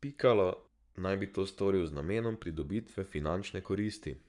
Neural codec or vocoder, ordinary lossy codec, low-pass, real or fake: codec, 44.1 kHz, 7.8 kbps, Pupu-Codec; MP3, 96 kbps; 10.8 kHz; fake